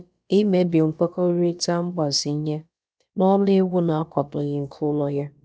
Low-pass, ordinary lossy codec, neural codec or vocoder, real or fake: none; none; codec, 16 kHz, about 1 kbps, DyCAST, with the encoder's durations; fake